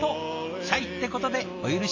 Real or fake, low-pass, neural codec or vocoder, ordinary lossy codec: real; 7.2 kHz; none; AAC, 48 kbps